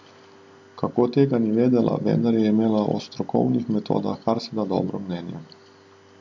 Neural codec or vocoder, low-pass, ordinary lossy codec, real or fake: none; 7.2 kHz; MP3, 48 kbps; real